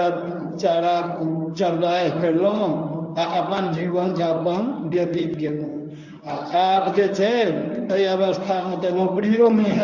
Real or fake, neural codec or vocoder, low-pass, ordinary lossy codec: fake; codec, 24 kHz, 0.9 kbps, WavTokenizer, medium speech release version 1; 7.2 kHz; none